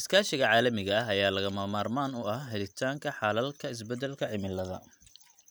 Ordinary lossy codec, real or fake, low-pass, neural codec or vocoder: none; real; none; none